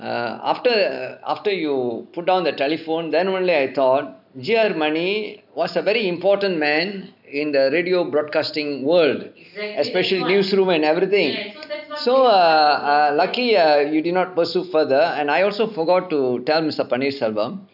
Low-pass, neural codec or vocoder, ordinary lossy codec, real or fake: 5.4 kHz; none; none; real